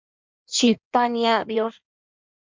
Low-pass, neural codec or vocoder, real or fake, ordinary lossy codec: 7.2 kHz; codec, 16 kHz in and 24 kHz out, 1.1 kbps, FireRedTTS-2 codec; fake; MP3, 64 kbps